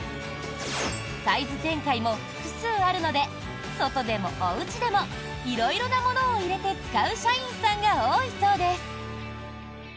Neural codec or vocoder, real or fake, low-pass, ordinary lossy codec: none; real; none; none